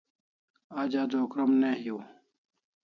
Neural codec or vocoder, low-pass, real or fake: none; 7.2 kHz; real